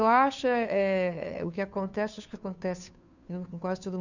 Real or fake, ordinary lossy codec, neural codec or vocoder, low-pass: fake; none; codec, 16 kHz, 2 kbps, FunCodec, trained on Chinese and English, 25 frames a second; 7.2 kHz